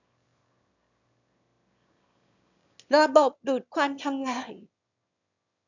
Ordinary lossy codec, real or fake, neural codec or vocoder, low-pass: none; fake; autoencoder, 22.05 kHz, a latent of 192 numbers a frame, VITS, trained on one speaker; 7.2 kHz